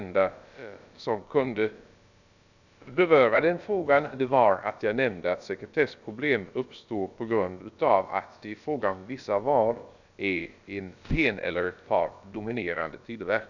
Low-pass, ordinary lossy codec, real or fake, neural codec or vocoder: 7.2 kHz; none; fake; codec, 16 kHz, about 1 kbps, DyCAST, with the encoder's durations